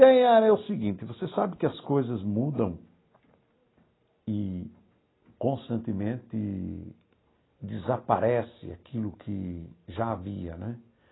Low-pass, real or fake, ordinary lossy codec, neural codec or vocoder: 7.2 kHz; real; AAC, 16 kbps; none